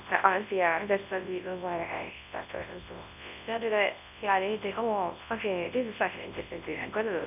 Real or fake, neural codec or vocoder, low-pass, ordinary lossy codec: fake; codec, 24 kHz, 0.9 kbps, WavTokenizer, large speech release; 3.6 kHz; none